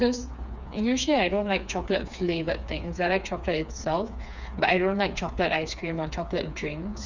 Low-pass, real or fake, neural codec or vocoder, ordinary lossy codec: 7.2 kHz; fake; codec, 16 kHz, 4 kbps, FreqCodec, smaller model; none